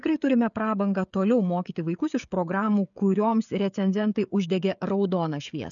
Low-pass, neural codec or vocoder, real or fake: 7.2 kHz; codec, 16 kHz, 16 kbps, FreqCodec, smaller model; fake